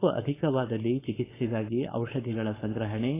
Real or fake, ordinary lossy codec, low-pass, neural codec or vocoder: fake; AAC, 16 kbps; 3.6 kHz; codec, 16 kHz, 4.8 kbps, FACodec